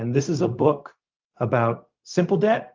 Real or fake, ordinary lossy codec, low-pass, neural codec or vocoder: fake; Opus, 32 kbps; 7.2 kHz; codec, 16 kHz, 0.4 kbps, LongCat-Audio-Codec